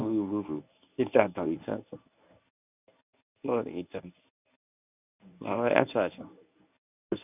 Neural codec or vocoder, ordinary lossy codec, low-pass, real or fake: codec, 24 kHz, 0.9 kbps, WavTokenizer, medium speech release version 1; none; 3.6 kHz; fake